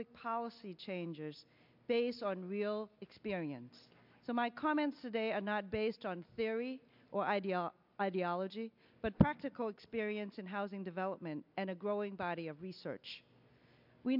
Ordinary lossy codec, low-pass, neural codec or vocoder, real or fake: MP3, 48 kbps; 5.4 kHz; none; real